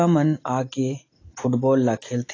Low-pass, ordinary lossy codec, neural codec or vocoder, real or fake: 7.2 kHz; AAC, 32 kbps; none; real